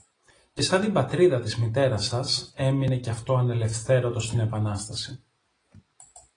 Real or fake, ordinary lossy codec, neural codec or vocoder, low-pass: real; AAC, 32 kbps; none; 9.9 kHz